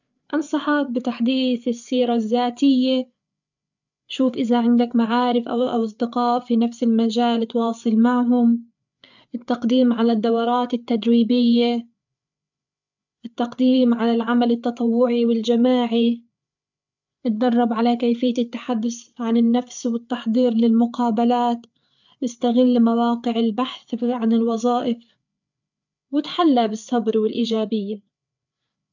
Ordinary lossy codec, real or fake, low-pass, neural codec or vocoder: none; fake; 7.2 kHz; codec, 16 kHz, 8 kbps, FreqCodec, larger model